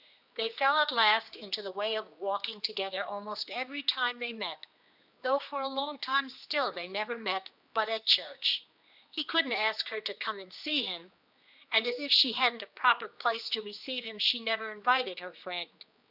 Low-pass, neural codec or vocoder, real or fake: 5.4 kHz; codec, 16 kHz, 2 kbps, X-Codec, HuBERT features, trained on general audio; fake